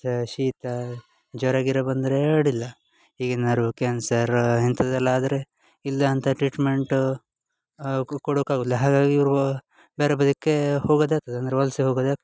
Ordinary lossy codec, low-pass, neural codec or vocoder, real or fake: none; none; none; real